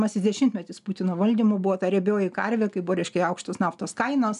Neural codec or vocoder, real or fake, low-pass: none; real; 10.8 kHz